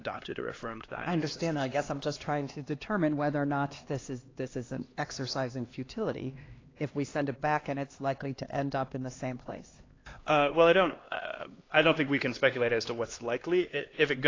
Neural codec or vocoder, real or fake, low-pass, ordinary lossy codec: codec, 16 kHz, 2 kbps, X-Codec, HuBERT features, trained on LibriSpeech; fake; 7.2 kHz; AAC, 32 kbps